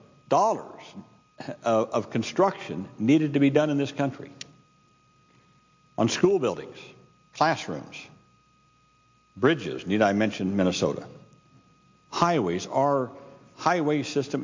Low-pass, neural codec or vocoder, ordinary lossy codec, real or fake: 7.2 kHz; none; MP3, 48 kbps; real